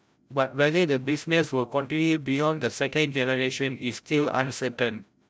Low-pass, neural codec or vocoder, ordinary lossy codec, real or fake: none; codec, 16 kHz, 0.5 kbps, FreqCodec, larger model; none; fake